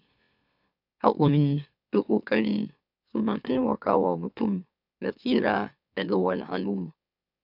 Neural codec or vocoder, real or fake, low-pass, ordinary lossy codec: autoencoder, 44.1 kHz, a latent of 192 numbers a frame, MeloTTS; fake; 5.4 kHz; none